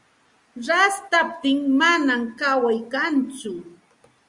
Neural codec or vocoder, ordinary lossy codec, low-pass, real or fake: none; Opus, 64 kbps; 10.8 kHz; real